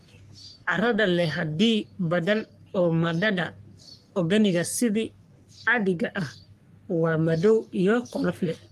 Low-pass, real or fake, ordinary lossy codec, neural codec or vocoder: 14.4 kHz; fake; Opus, 32 kbps; codec, 44.1 kHz, 3.4 kbps, Pupu-Codec